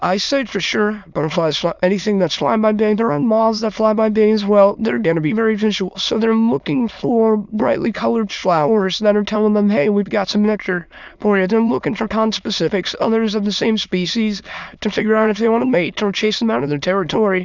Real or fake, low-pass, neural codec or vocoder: fake; 7.2 kHz; autoencoder, 22.05 kHz, a latent of 192 numbers a frame, VITS, trained on many speakers